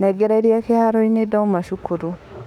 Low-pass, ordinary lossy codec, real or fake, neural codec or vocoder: 19.8 kHz; none; fake; autoencoder, 48 kHz, 32 numbers a frame, DAC-VAE, trained on Japanese speech